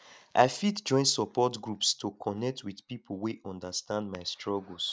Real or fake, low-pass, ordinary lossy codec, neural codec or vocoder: real; none; none; none